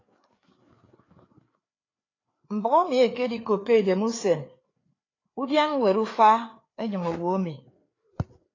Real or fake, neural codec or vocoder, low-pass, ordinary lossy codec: fake; codec, 16 kHz, 4 kbps, FreqCodec, larger model; 7.2 kHz; AAC, 32 kbps